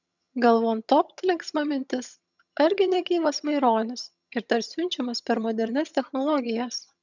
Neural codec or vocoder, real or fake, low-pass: vocoder, 22.05 kHz, 80 mel bands, HiFi-GAN; fake; 7.2 kHz